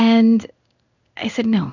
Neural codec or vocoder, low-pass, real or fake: codec, 16 kHz in and 24 kHz out, 1 kbps, XY-Tokenizer; 7.2 kHz; fake